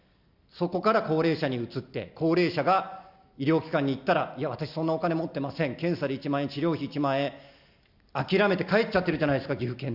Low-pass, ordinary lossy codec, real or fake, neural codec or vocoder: 5.4 kHz; Opus, 64 kbps; real; none